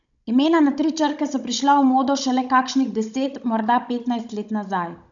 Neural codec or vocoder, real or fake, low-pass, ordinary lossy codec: codec, 16 kHz, 16 kbps, FunCodec, trained on Chinese and English, 50 frames a second; fake; 7.2 kHz; none